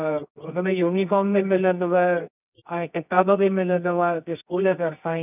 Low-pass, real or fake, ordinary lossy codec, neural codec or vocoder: 3.6 kHz; fake; none; codec, 24 kHz, 0.9 kbps, WavTokenizer, medium music audio release